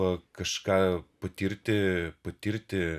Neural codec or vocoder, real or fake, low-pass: none; real; 14.4 kHz